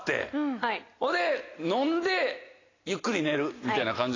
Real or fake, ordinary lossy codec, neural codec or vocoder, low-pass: real; AAC, 32 kbps; none; 7.2 kHz